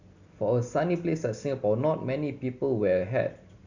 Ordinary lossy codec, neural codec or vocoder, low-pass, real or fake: none; none; 7.2 kHz; real